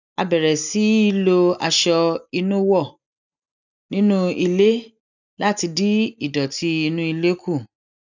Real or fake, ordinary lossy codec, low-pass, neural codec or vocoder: real; none; 7.2 kHz; none